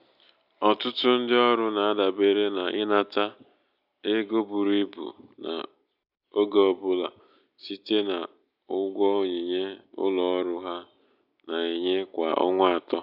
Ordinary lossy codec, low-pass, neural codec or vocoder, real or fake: none; 5.4 kHz; none; real